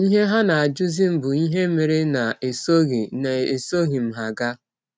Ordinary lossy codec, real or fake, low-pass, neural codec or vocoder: none; real; none; none